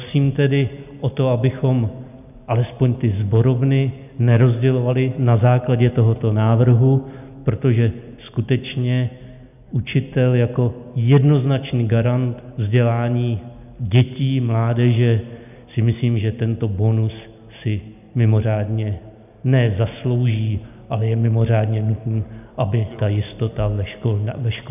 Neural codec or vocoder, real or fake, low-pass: none; real; 3.6 kHz